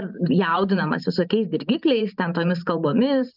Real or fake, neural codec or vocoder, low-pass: fake; vocoder, 44.1 kHz, 128 mel bands every 512 samples, BigVGAN v2; 5.4 kHz